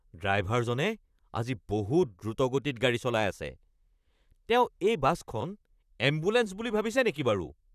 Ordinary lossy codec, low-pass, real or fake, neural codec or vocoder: none; 14.4 kHz; fake; vocoder, 44.1 kHz, 128 mel bands, Pupu-Vocoder